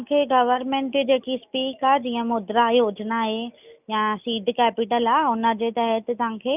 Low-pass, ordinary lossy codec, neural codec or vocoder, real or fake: 3.6 kHz; none; none; real